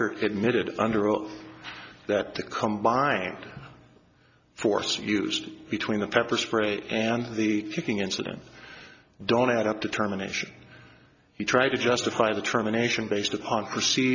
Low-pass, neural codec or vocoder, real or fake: 7.2 kHz; none; real